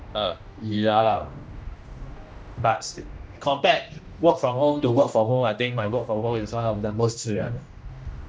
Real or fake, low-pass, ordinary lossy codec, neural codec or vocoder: fake; none; none; codec, 16 kHz, 1 kbps, X-Codec, HuBERT features, trained on general audio